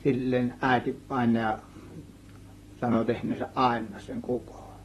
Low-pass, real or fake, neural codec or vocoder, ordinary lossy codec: 19.8 kHz; fake; vocoder, 44.1 kHz, 128 mel bands, Pupu-Vocoder; AAC, 32 kbps